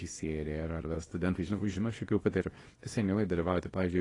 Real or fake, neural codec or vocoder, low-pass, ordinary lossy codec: fake; codec, 24 kHz, 0.9 kbps, WavTokenizer, small release; 10.8 kHz; AAC, 32 kbps